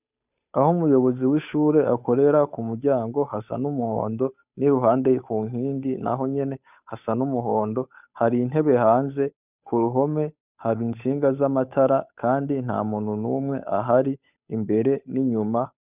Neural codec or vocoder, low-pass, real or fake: codec, 16 kHz, 8 kbps, FunCodec, trained on Chinese and English, 25 frames a second; 3.6 kHz; fake